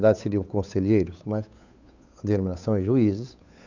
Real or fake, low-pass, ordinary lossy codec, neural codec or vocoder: fake; 7.2 kHz; none; codec, 16 kHz, 8 kbps, FunCodec, trained on Chinese and English, 25 frames a second